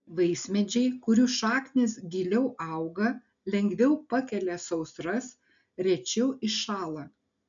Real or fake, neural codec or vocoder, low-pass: real; none; 7.2 kHz